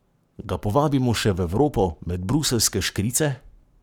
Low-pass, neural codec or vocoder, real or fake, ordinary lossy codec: none; codec, 44.1 kHz, 7.8 kbps, Pupu-Codec; fake; none